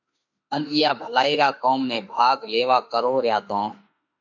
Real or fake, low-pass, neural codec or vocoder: fake; 7.2 kHz; autoencoder, 48 kHz, 32 numbers a frame, DAC-VAE, trained on Japanese speech